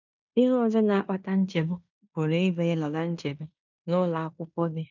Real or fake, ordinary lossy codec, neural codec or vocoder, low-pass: fake; none; codec, 16 kHz in and 24 kHz out, 0.9 kbps, LongCat-Audio-Codec, fine tuned four codebook decoder; 7.2 kHz